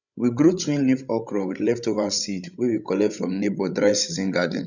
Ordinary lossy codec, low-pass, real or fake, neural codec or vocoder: none; 7.2 kHz; fake; codec, 16 kHz, 16 kbps, FreqCodec, larger model